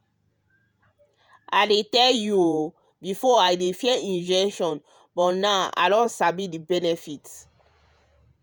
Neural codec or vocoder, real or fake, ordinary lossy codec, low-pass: vocoder, 48 kHz, 128 mel bands, Vocos; fake; none; none